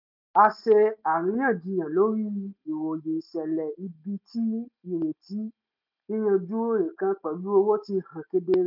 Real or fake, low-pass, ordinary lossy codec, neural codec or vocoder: real; 5.4 kHz; none; none